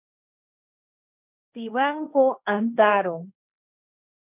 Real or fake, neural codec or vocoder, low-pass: fake; codec, 16 kHz, 1.1 kbps, Voila-Tokenizer; 3.6 kHz